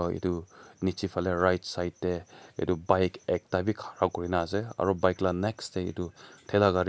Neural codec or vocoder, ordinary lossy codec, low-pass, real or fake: none; none; none; real